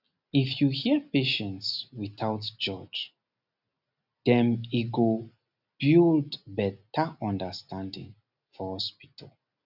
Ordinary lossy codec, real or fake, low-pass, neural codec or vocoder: none; real; 5.4 kHz; none